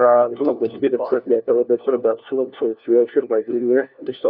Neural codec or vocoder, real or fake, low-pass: codec, 16 kHz, 1 kbps, FunCodec, trained on LibriTTS, 50 frames a second; fake; 5.4 kHz